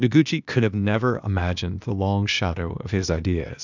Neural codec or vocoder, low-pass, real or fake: codec, 16 kHz, 0.8 kbps, ZipCodec; 7.2 kHz; fake